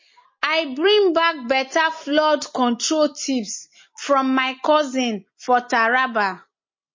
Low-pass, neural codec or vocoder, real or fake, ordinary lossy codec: 7.2 kHz; none; real; MP3, 32 kbps